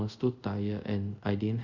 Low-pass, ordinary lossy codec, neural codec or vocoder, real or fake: 7.2 kHz; none; codec, 24 kHz, 0.5 kbps, DualCodec; fake